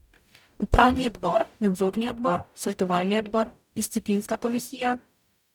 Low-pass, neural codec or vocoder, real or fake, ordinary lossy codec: 19.8 kHz; codec, 44.1 kHz, 0.9 kbps, DAC; fake; none